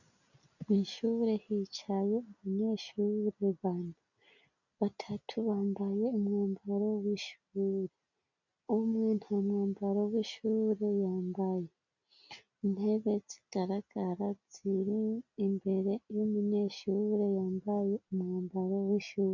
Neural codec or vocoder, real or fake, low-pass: none; real; 7.2 kHz